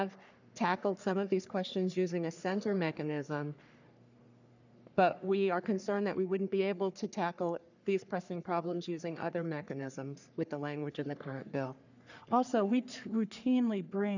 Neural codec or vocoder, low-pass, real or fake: codec, 44.1 kHz, 3.4 kbps, Pupu-Codec; 7.2 kHz; fake